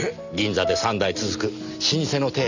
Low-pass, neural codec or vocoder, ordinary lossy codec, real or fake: 7.2 kHz; none; none; real